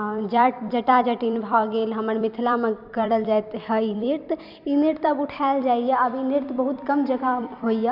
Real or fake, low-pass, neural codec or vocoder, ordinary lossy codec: fake; 5.4 kHz; vocoder, 44.1 kHz, 128 mel bands every 256 samples, BigVGAN v2; none